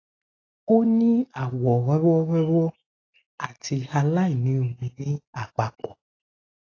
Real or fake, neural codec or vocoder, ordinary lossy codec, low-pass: real; none; AAC, 32 kbps; 7.2 kHz